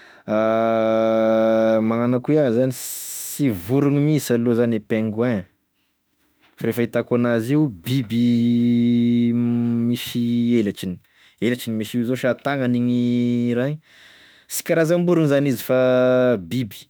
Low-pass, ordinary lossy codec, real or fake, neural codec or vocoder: none; none; fake; autoencoder, 48 kHz, 32 numbers a frame, DAC-VAE, trained on Japanese speech